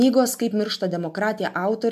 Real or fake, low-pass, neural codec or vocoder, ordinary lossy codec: real; 14.4 kHz; none; AAC, 96 kbps